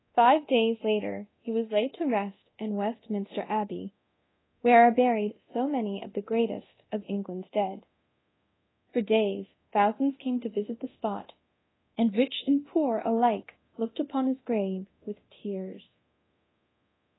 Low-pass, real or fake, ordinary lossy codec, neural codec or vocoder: 7.2 kHz; fake; AAC, 16 kbps; codec, 24 kHz, 0.9 kbps, DualCodec